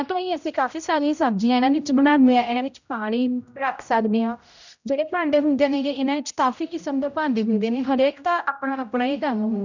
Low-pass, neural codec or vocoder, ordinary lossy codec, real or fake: 7.2 kHz; codec, 16 kHz, 0.5 kbps, X-Codec, HuBERT features, trained on general audio; none; fake